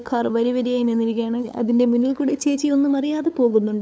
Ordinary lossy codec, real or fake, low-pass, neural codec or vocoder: none; fake; none; codec, 16 kHz, 8 kbps, FunCodec, trained on LibriTTS, 25 frames a second